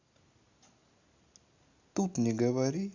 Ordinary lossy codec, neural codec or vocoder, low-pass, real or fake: Opus, 64 kbps; none; 7.2 kHz; real